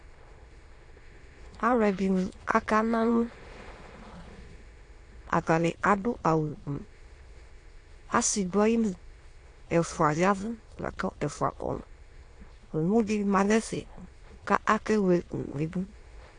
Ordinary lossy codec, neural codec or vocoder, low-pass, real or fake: AAC, 48 kbps; autoencoder, 22.05 kHz, a latent of 192 numbers a frame, VITS, trained on many speakers; 9.9 kHz; fake